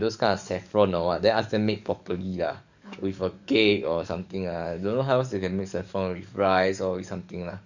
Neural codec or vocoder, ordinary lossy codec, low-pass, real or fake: codec, 44.1 kHz, 7.8 kbps, DAC; none; 7.2 kHz; fake